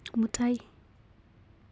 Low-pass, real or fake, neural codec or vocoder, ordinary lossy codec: none; real; none; none